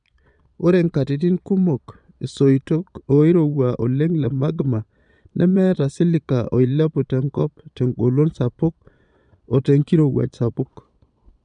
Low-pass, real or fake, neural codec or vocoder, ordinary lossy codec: 9.9 kHz; fake; vocoder, 22.05 kHz, 80 mel bands, Vocos; none